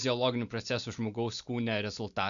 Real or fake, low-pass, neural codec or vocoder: real; 7.2 kHz; none